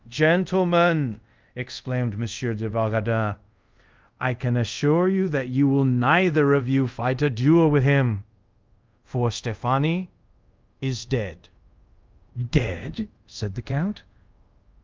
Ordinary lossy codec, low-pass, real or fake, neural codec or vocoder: Opus, 24 kbps; 7.2 kHz; fake; codec, 24 kHz, 0.5 kbps, DualCodec